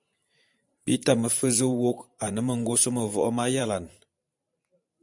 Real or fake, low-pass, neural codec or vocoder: fake; 10.8 kHz; vocoder, 44.1 kHz, 128 mel bands every 512 samples, BigVGAN v2